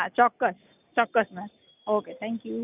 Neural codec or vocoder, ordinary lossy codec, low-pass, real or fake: none; none; 3.6 kHz; real